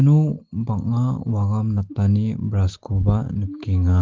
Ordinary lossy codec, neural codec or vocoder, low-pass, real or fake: Opus, 16 kbps; none; 7.2 kHz; real